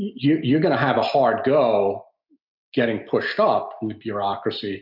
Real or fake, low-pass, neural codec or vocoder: real; 5.4 kHz; none